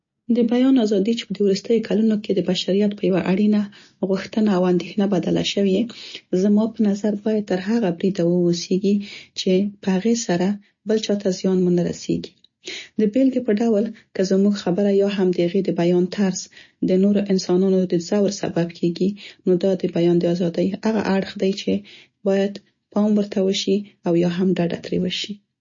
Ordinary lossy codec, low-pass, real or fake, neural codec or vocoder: MP3, 32 kbps; 7.2 kHz; real; none